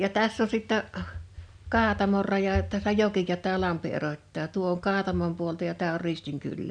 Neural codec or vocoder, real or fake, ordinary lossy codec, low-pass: none; real; none; 9.9 kHz